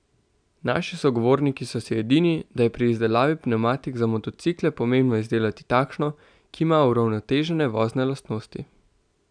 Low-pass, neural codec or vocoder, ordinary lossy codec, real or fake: 9.9 kHz; none; none; real